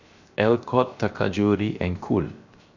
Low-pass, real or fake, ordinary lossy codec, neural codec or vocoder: 7.2 kHz; fake; none; codec, 16 kHz, 0.7 kbps, FocalCodec